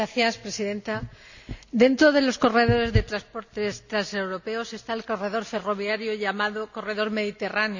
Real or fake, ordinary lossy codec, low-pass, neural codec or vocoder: real; none; 7.2 kHz; none